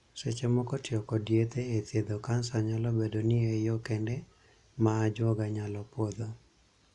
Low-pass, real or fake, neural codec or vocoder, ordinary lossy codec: 10.8 kHz; real; none; none